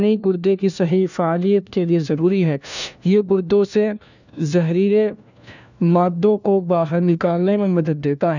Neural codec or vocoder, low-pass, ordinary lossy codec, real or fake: codec, 16 kHz, 1 kbps, FunCodec, trained on LibriTTS, 50 frames a second; 7.2 kHz; none; fake